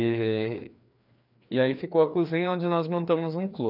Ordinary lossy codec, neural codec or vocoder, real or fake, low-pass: none; codec, 16 kHz, 2 kbps, FreqCodec, larger model; fake; 5.4 kHz